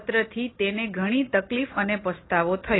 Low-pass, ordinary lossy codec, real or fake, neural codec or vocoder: 7.2 kHz; AAC, 16 kbps; real; none